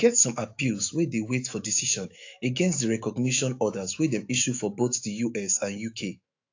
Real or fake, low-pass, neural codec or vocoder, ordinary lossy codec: fake; 7.2 kHz; autoencoder, 48 kHz, 128 numbers a frame, DAC-VAE, trained on Japanese speech; AAC, 48 kbps